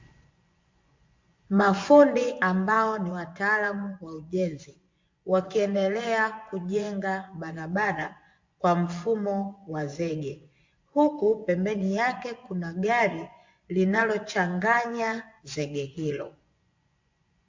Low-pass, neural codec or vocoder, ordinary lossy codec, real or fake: 7.2 kHz; vocoder, 24 kHz, 100 mel bands, Vocos; MP3, 48 kbps; fake